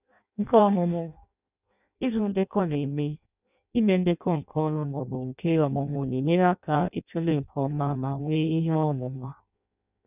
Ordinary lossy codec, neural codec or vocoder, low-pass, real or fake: none; codec, 16 kHz in and 24 kHz out, 0.6 kbps, FireRedTTS-2 codec; 3.6 kHz; fake